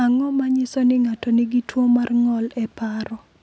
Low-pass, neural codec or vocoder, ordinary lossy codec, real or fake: none; none; none; real